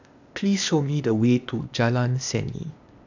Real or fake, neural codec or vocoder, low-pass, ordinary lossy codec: fake; codec, 16 kHz, 0.8 kbps, ZipCodec; 7.2 kHz; none